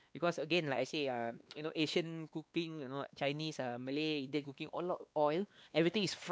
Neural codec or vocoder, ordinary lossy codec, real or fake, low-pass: codec, 16 kHz, 2 kbps, X-Codec, WavLM features, trained on Multilingual LibriSpeech; none; fake; none